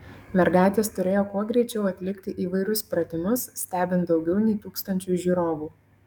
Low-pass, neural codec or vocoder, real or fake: 19.8 kHz; codec, 44.1 kHz, 7.8 kbps, DAC; fake